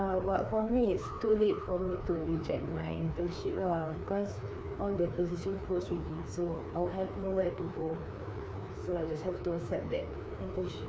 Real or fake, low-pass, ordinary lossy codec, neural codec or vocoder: fake; none; none; codec, 16 kHz, 2 kbps, FreqCodec, larger model